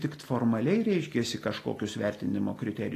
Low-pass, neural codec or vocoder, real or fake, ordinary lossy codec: 14.4 kHz; none; real; AAC, 48 kbps